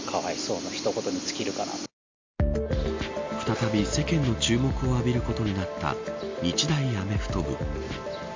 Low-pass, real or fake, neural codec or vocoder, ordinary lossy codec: 7.2 kHz; real; none; MP3, 48 kbps